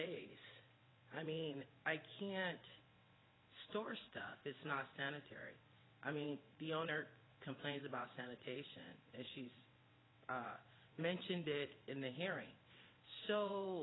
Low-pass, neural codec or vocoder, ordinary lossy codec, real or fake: 7.2 kHz; vocoder, 22.05 kHz, 80 mel bands, WaveNeXt; AAC, 16 kbps; fake